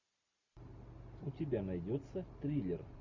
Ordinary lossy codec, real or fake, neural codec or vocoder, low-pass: MP3, 48 kbps; real; none; 7.2 kHz